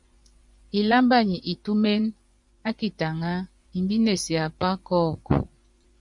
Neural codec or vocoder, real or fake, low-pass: vocoder, 24 kHz, 100 mel bands, Vocos; fake; 10.8 kHz